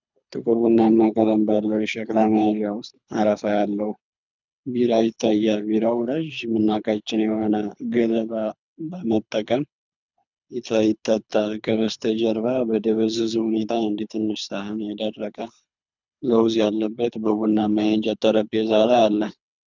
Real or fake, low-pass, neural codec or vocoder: fake; 7.2 kHz; codec, 24 kHz, 3 kbps, HILCodec